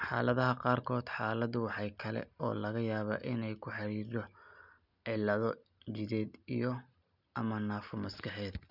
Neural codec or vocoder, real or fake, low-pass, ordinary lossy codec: none; real; 5.4 kHz; none